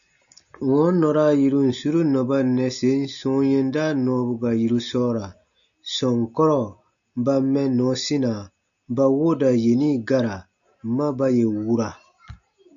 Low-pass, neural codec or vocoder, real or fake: 7.2 kHz; none; real